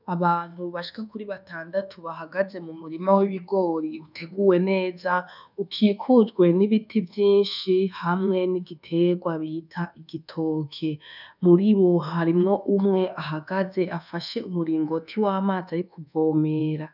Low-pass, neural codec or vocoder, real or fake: 5.4 kHz; codec, 24 kHz, 1.2 kbps, DualCodec; fake